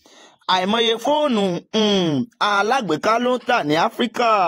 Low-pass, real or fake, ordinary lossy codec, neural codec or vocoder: 19.8 kHz; fake; AAC, 48 kbps; vocoder, 48 kHz, 128 mel bands, Vocos